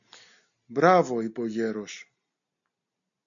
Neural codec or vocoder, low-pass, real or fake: none; 7.2 kHz; real